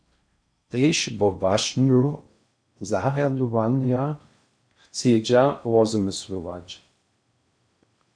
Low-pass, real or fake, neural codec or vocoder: 9.9 kHz; fake; codec, 16 kHz in and 24 kHz out, 0.6 kbps, FocalCodec, streaming, 4096 codes